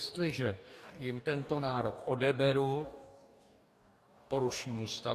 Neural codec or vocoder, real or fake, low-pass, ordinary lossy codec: codec, 44.1 kHz, 2.6 kbps, DAC; fake; 14.4 kHz; AAC, 64 kbps